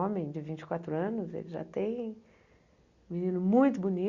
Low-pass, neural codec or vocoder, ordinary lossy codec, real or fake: 7.2 kHz; none; Opus, 64 kbps; real